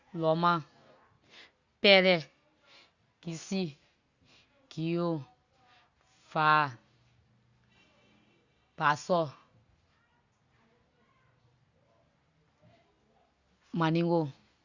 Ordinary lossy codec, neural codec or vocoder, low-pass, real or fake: Opus, 64 kbps; none; 7.2 kHz; real